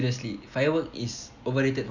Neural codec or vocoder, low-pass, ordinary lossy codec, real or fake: none; 7.2 kHz; none; real